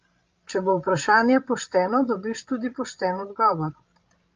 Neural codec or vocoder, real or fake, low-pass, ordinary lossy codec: codec, 16 kHz, 16 kbps, FreqCodec, larger model; fake; 7.2 kHz; Opus, 24 kbps